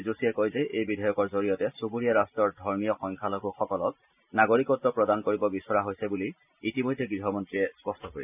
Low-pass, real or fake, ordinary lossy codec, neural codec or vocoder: 3.6 kHz; real; none; none